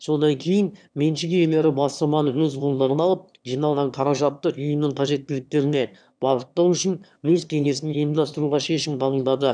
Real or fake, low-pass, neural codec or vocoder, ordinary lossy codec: fake; 9.9 kHz; autoencoder, 22.05 kHz, a latent of 192 numbers a frame, VITS, trained on one speaker; none